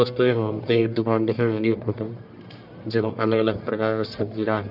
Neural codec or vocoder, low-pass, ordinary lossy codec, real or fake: codec, 44.1 kHz, 1.7 kbps, Pupu-Codec; 5.4 kHz; none; fake